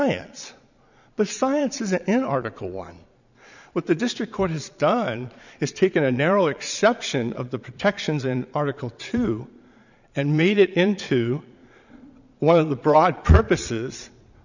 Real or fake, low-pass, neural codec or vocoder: fake; 7.2 kHz; vocoder, 22.05 kHz, 80 mel bands, Vocos